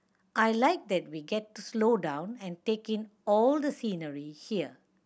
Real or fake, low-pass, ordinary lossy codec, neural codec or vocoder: real; none; none; none